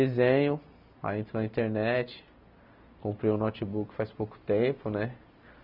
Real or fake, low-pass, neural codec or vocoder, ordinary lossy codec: real; 5.4 kHz; none; none